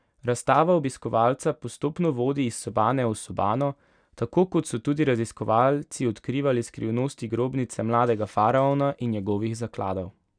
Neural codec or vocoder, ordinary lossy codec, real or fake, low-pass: none; none; real; 9.9 kHz